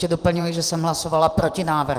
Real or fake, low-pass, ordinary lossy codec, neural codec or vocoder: fake; 14.4 kHz; Opus, 24 kbps; vocoder, 44.1 kHz, 128 mel bands every 512 samples, BigVGAN v2